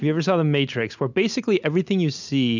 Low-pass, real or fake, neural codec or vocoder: 7.2 kHz; real; none